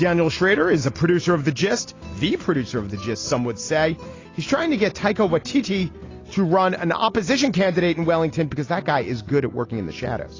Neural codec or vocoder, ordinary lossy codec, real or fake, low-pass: none; AAC, 32 kbps; real; 7.2 kHz